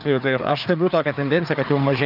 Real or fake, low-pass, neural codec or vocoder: fake; 5.4 kHz; codec, 16 kHz, 4 kbps, FreqCodec, larger model